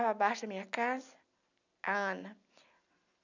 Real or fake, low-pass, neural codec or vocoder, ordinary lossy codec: real; 7.2 kHz; none; none